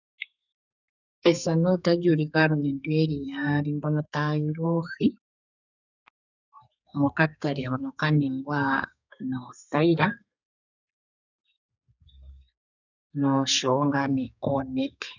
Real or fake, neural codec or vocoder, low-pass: fake; codec, 32 kHz, 1.9 kbps, SNAC; 7.2 kHz